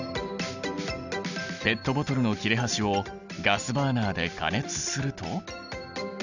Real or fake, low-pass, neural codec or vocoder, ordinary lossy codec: real; 7.2 kHz; none; none